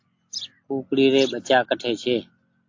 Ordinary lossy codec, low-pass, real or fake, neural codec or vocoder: AAC, 48 kbps; 7.2 kHz; real; none